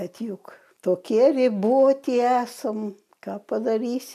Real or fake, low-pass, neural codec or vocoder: real; 14.4 kHz; none